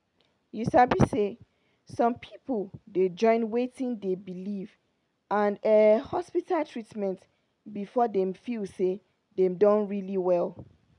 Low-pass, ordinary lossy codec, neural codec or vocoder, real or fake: 10.8 kHz; none; none; real